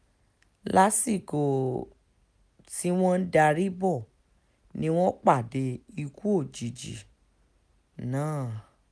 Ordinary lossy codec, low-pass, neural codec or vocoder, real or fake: none; none; none; real